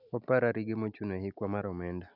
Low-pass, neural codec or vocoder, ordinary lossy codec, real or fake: 5.4 kHz; none; none; real